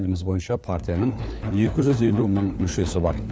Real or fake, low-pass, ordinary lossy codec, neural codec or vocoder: fake; none; none; codec, 16 kHz, 4 kbps, FunCodec, trained on LibriTTS, 50 frames a second